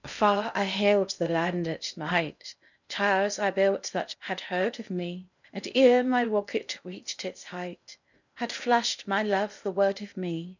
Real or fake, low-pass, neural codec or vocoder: fake; 7.2 kHz; codec, 16 kHz in and 24 kHz out, 0.6 kbps, FocalCodec, streaming, 2048 codes